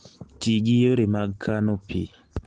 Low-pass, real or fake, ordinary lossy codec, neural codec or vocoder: 9.9 kHz; real; Opus, 16 kbps; none